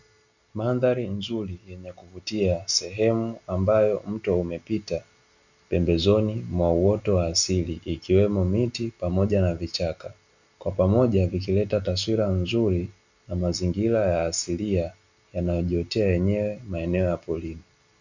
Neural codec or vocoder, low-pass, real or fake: none; 7.2 kHz; real